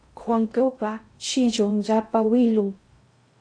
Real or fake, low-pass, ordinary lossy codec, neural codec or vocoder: fake; 9.9 kHz; AAC, 48 kbps; codec, 16 kHz in and 24 kHz out, 0.6 kbps, FocalCodec, streaming, 2048 codes